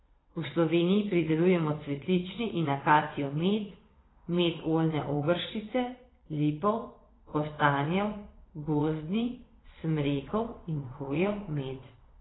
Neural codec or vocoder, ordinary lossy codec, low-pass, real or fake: vocoder, 22.05 kHz, 80 mel bands, WaveNeXt; AAC, 16 kbps; 7.2 kHz; fake